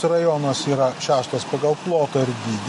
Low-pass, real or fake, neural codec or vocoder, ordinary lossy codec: 14.4 kHz; real; none; MP3, 48 kbps